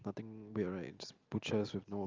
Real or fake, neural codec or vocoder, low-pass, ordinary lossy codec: real; none; 7.2 kHz; Opus, 32 kbps